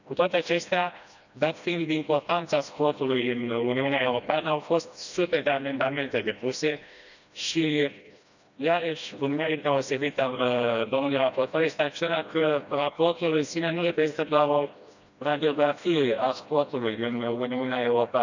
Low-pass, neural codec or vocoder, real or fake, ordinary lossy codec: 7.2 kHz; codec, 16 kHz, 1 kbps, FreqCodec, smaller model; fake; none